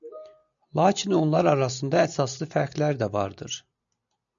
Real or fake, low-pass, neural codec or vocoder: real; 7.2 kHz; none